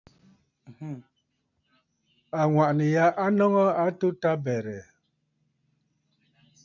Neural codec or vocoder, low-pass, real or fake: none; 7.2 kHz; real